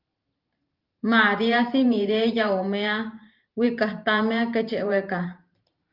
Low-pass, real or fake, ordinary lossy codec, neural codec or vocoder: 5.4 kHz; fake; Opus, 32 kbps; vocoder, 44.1 kHz, 128 mel bands every 512 samples, BigVGAN v2